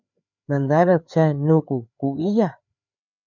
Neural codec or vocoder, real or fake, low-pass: codec, 16 kHz, 4 kbps, FreqCodec, larger model; fake; 7.2 kHz